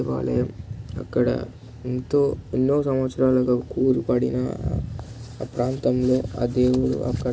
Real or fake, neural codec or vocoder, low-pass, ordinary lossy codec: real; none; none; none